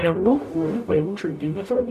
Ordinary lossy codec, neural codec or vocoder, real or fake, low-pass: AAC, 96 kbps; codec, 44.1 kHz, 0.9 kbps, DAC; fake; 14.4 kHz